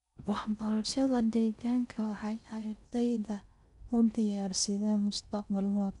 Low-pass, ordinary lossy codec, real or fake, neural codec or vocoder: 10.8 kHz; none; fake; codec, 16 kHz in and 24 kHz out, 0.6 kbps, FocalCodec, streaming, 4096 codes